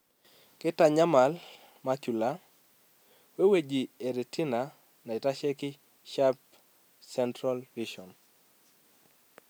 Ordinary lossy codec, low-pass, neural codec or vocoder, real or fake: none; none; none; real